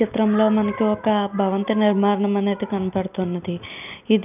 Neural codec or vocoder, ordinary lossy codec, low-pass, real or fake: none; none; 3.6 kHz; real